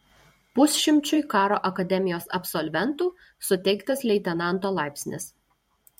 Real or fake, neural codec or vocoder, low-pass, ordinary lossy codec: fake; vocoder, 44.1 kHz, 128 mel bands every 512 samples, BigVGAN v2; 19.8 kHz; MP3, 64 kbps